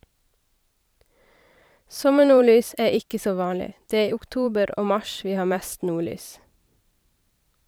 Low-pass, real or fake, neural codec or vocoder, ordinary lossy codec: none; fake; vocoder, 44.1 kHz, 128 mel bands every 512 samples, BigVGAN v2; none